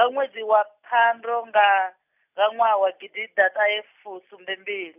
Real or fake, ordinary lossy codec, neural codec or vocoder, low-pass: real; none; none; 3.6 kHz